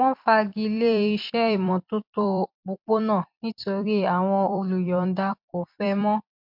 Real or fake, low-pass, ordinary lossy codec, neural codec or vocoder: fake; 5.4 kHz; MP3, 48 kbps; vocoder, 44.1 kHz, 128 mel bands every 256 samples, BigVGAN v2